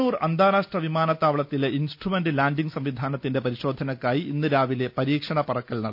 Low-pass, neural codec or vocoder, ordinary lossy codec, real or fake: 5.4 kHz; none; none; real